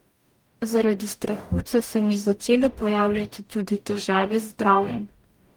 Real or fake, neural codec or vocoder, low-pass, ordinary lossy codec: fake; codec, 44.1 kHz, 0.9 kbps, DAC; 19.8 kHz; Opus, 32 kbps